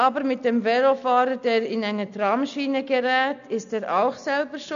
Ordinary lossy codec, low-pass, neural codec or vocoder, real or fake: none; 7.2 kHz; none; real